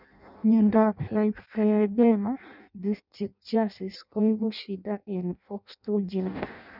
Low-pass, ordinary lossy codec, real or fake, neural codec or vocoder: 5.4 kHz; none; fake; codec, 16 kHz in and 24 kHz out, 0.6 kbps, FireRedTTS-2 codec